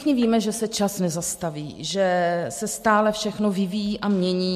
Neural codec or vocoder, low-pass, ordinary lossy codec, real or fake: none; 14.4 kHz; MP3, 64 kbps; real